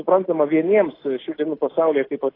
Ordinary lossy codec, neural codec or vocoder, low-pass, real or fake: AAC, 24 kbps; none; 5.4 kHz; real